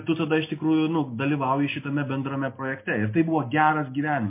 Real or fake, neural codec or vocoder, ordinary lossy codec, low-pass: real; none; MP3, 24 kbps; 3.6 kHz